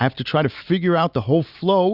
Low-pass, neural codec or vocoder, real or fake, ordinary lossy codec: 5.4 kHz; none; real; Opus, 64 kbps